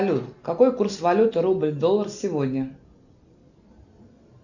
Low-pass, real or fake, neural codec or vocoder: 7.2 kHz; real; none